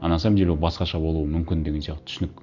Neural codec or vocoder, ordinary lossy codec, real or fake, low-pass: none; Opus, 64 kbps; real; 7.2 kHz